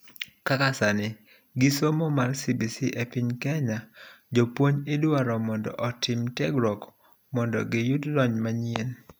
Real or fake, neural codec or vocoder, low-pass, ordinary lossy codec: real; none; none; none